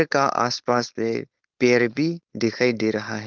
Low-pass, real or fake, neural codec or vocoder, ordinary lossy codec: 7.2 kHz; fake; codec, 16 kHz, 4.8 kbps, FACodec; Opus, 32 kbps